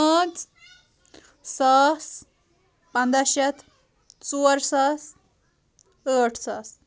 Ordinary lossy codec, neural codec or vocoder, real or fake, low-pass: none; none; real; none